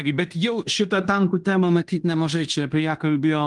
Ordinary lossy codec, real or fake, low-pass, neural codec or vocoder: Opus, 24 kbps; fake; 10.8 kHz; codec, 16 kHz in and 24 kHz out, 0.9 kbps, LongCat-Audio-Codec, fine tuned four codebook decoder